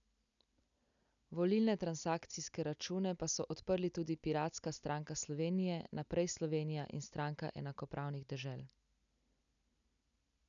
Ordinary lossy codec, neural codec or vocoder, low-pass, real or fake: none; none; 7.2 kHz; real